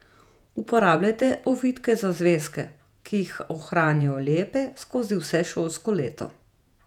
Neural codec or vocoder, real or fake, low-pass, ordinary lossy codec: vocoder, 48 kHz, 128 mel bands, Vocos; fake; 19.8 kHz; none